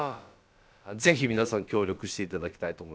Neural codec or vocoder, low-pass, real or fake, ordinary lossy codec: codec, 16 kHz, about 1 kbps, DyCAST, with the encoder's durations; none; fake; none